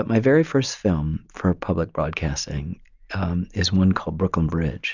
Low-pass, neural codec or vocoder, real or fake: 7.2 kHz; none; real